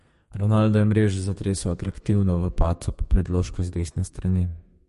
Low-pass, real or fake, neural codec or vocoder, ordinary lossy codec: 14.4 kHz; fake; codec, 32 kHz, 1.9 kbps, SNAC; MP3, 48 kbps